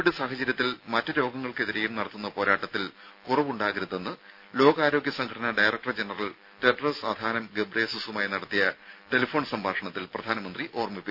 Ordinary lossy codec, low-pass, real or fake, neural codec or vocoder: none; 5.4 kHz; real; none